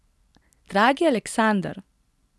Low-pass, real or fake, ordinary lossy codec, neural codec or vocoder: none; real; none; none